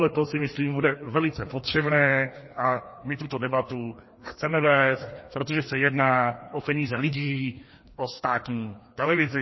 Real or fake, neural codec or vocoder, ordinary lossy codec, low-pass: fake; codec, 16 kHz, 2 kbps, FreqCodec, larger model; MP3, 24 kbps; 7.2 kHz